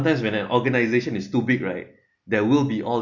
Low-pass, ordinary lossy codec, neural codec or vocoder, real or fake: 7.2 kHz; none; none; real